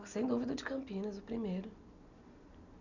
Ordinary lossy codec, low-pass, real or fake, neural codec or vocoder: none; 7.2 kHz; real; none